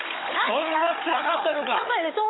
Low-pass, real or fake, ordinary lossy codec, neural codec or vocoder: 7.2 kHz; fake; AAC, 16 kbps; codec, 16 kHz, 16 kbps, FunCodec, trained on Chinese and English, 50 frames a second